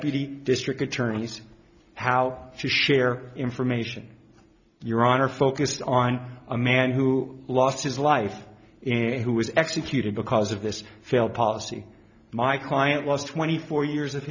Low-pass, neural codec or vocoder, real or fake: 7.2 kHz; none; real